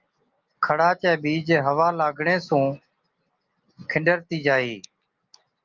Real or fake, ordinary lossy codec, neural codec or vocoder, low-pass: real; Opus, 24 kbps; none; 7.2 kHz